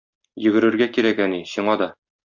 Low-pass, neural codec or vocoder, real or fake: 7.2 kHz; none; real